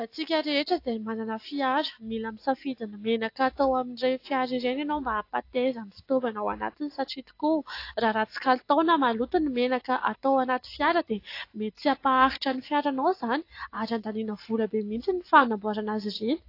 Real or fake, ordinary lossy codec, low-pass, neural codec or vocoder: real; AAC, 32 kbps; 5.4 kHz; none